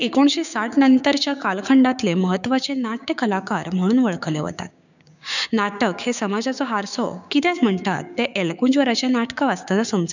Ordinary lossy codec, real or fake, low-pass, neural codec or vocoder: none; fake; 7.2 kHz; codec, 16 kHz, 6 kbps, DAC